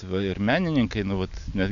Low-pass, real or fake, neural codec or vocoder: 7.2 kHz; real; none